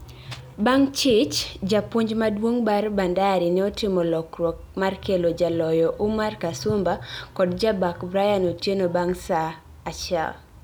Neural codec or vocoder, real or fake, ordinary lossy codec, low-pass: none; real; none; none